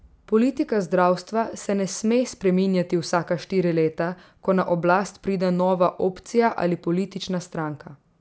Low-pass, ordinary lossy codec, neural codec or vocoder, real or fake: none; none; none; real